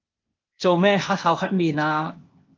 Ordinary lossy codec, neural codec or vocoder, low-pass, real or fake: Opus, 24 kbps; codec, 16 kHz, 0.8 kbps, ZipCodec; 7.2 kHz; fake